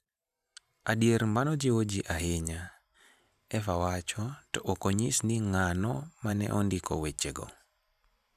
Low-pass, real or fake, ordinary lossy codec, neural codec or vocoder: 14.4 kHz; fake; none; vocoder, 44.1 kHz, 128 mel bands every 512 samples, BigVGAN v2